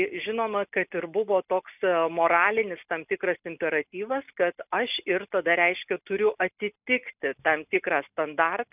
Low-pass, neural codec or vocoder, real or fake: 3.6 kHz; none; real